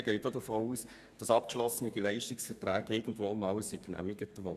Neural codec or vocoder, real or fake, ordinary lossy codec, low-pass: codec, 32 kHz, 1.9 kbps, SNAC; fake; none; 14.4 kHz